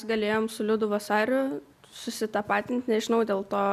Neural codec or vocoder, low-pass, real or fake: none; 14.4 kHz; real